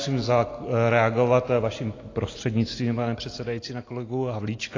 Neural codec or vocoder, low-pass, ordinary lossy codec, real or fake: none; 7.2 kHz; AAC, 32 kbps; real